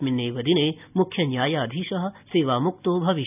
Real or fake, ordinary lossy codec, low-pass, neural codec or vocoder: real; none; 3.6 kHz; none